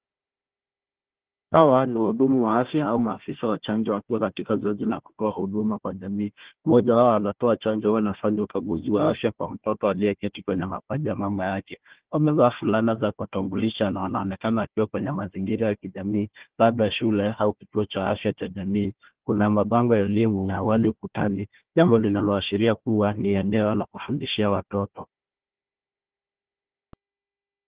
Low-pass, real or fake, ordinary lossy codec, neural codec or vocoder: 3.6 kHz; fake; Opus, 16 kbps; codec, 16 kHz, 1 kbps, FunCodec, trained on Chinese and English, 50 frames a second